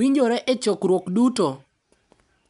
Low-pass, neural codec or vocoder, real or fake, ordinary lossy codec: 10.8 kHz; none; real; none